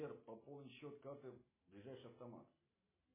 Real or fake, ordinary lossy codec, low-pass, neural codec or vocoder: real; MP3, 16 kbps; 3.6 kHz; none